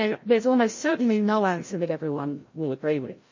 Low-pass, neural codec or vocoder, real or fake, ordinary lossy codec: 7.2 kHz; codec, 16 kHz, 0.5 kbps, FreqCodec, larger model; fake; MP3, 32 kbps